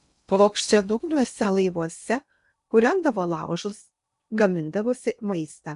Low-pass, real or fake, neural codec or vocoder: 10.8 kHz; fake; codec, 16 kHz in and 24 kHz out, 0.6 kbps, FocalCodec, streaming, 2048 codes